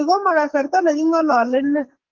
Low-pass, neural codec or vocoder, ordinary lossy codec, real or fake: 7.2 kHz; codec, 44.1 kHz, 2.6 kbps, SNAC; Opus, 32 kbps; fake